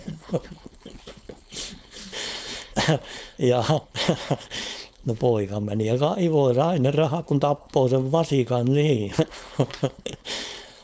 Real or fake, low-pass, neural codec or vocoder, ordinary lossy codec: fake; none; codec, 16 kHz, 4.8 kbps, FACodec; none